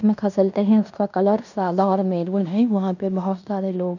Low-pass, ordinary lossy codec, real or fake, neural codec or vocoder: 7.2 kHz; none; fake; codec, 16 kHz in and 24 kHz out, 0.9 kbps, LongCat-Audio-Codec, fine tuned four codebook decoder